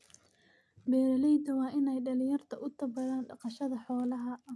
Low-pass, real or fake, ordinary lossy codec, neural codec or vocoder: none; real; none; none